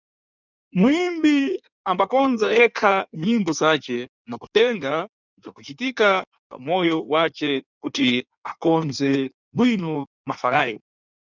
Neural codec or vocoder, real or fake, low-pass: codec, 16 kHz in and 24 kHz out, 1.1 kbps, FireRedTTS-2 codec; fake; 7.2 kHz